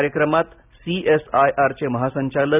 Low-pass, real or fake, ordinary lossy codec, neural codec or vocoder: 3.6 kHz; real; none; none